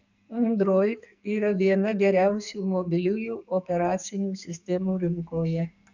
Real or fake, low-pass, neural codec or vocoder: fake; 7.2 kHz; codec, 44.1 kHz, 2.6 kbps, SNAC